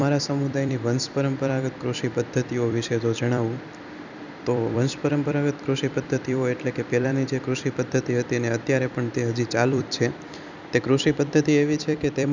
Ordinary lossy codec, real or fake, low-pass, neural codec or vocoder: none; fake; 7.2 kHz; vocoder, 44.1 kHz, 128 mel bands every 256 samples, BigVGAN v2